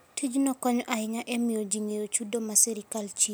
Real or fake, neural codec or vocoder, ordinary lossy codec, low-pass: real; none; none; none